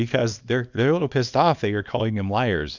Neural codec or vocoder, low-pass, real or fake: codec, 24 kHz, 0.9 kbps, WavTokenizer, small release; 7.2 kHz; fake